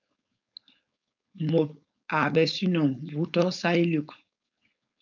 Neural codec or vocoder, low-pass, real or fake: codec, 16 kHz, 4.8 kbps, FACodec; 7.2 kHz; fake